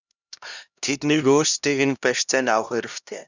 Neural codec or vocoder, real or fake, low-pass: codec, 16 kHz, 1 kbps, X-Codec, HuBERT features, trained on LibriSpeech; fake; 7.2 kHz